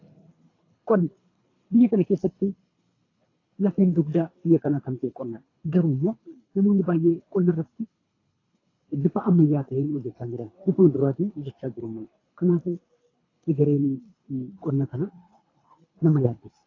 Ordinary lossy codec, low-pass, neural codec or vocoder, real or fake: AAC, 32 kbps; 7.2 kHz; codec, 24 kHz, 3 kbps, HILCodec; fake